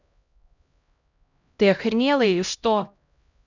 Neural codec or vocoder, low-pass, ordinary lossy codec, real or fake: codec, 16 kHz, 0.5 kbps, X-Codec, HuBERT features, trained on LibriSpeech; 7.2 kHz; none; fake